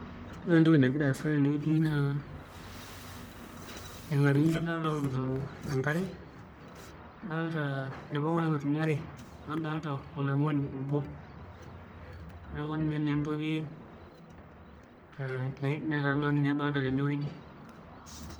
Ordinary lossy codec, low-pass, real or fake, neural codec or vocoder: none; none; fake; codec, 44.1 kHz, 1.7 kbps, Pupu-Codec